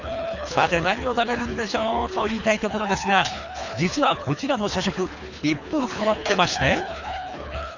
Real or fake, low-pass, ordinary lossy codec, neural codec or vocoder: fake; 7.2 kHz; none; codec, 24 kHz, 3 kbps, HILCodec